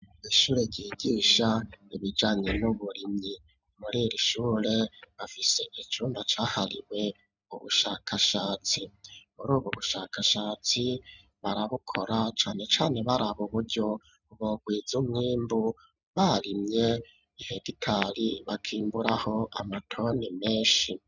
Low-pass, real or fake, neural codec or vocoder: 7.2 kHz; real; none